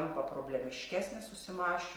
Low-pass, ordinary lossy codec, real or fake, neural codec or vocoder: 19.8 kHz; Opus, 24 kbps; real; none